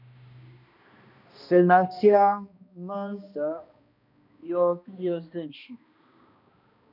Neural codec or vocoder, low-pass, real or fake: codec, 16 kHz, 1 kbps, X-Codec, HuBERT features, trained on balanced general audio; 5.4 kHz; fake